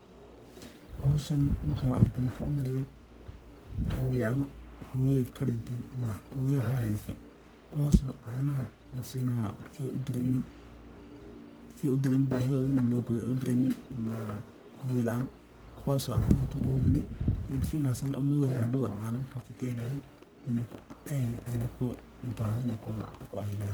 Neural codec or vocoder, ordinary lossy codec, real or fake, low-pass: codec, 44.1 kHz, 1.7 kbps, Pupu-Codec; none; fake; none